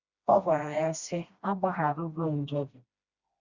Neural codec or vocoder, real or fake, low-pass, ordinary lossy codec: codec, 16 kHz, 1 kbps, FreqCodec, smaller model; fake; 7.2 kHz; Opus, 64 kbps